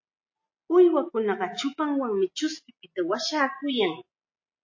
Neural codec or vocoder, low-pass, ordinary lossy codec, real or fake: none; 7.2 kHz; MP3, 32 kbps; real